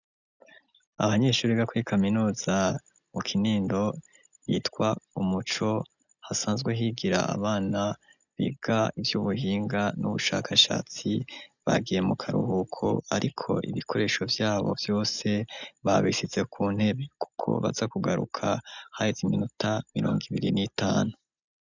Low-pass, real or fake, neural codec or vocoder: 7.2 kHz; real; none